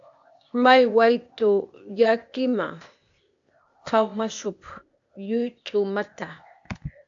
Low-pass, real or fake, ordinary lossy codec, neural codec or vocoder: 7.2 kHz; fake; AAC, 64 kbps; codec, 16 kHz, 0.8 kbps, ZipCodec